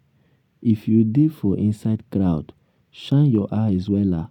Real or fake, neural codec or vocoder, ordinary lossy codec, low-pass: real; none; none; 19.8 kHz